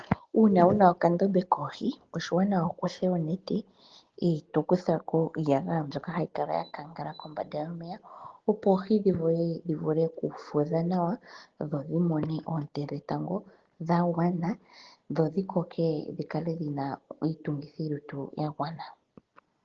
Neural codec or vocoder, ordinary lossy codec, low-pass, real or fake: none; Opus, 16 kbps; 7.2 kHz; real